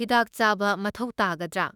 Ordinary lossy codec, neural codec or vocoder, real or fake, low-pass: none; autoencoder, 48 kHz, 32 numbers a frame, DAC-VAE, trained on Japanese speech; fake; 19.8 kHz